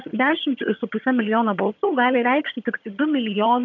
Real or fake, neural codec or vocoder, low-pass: fake; vocoder, 22.05 kHz, 80 mel bands, HiFi-GAN; 7.2 kHz